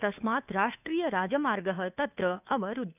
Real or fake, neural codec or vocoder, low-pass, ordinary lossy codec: fake; codec, 16 kHz, 2 kbps, FunCodec, trained on Chinese and English, 25 frames a second; 3.6 kHz; none